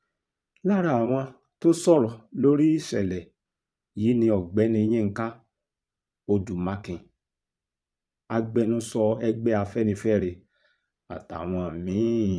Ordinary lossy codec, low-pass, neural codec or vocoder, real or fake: none; none; vocoder, 22.05 kHz, 80 mel bands, Vocos; fake